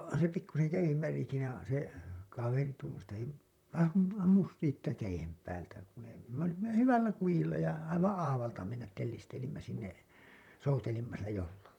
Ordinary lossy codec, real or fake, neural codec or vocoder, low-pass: none; fake; vocoder, 44.1 kHz, 128 mel bands, Pupu-Vocoder; 19.8 kHz